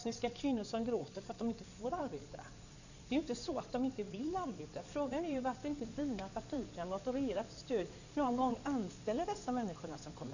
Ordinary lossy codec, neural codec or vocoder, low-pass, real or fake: none; codec, 16 kHz in and 24 kHz out, 2.2 kbps, FireRedTTS-2 codec; 7.2 kHz; fake